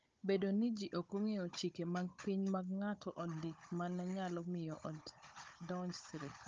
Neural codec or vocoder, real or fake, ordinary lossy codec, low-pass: codec, 16 kHz, 16 kbps, FunCodec, trained on Chinese and English, 50 frames a second; fake; Opus, 24 kbps; 7.2 kHz